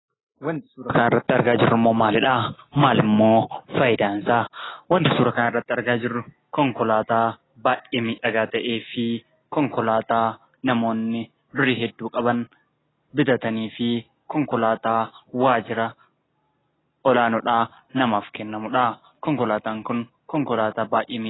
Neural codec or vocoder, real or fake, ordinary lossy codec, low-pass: none; real; AAC, 16 kbps; 7.2 kHz